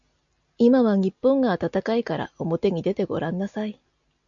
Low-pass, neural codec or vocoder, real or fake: 7.2 kHz; none; real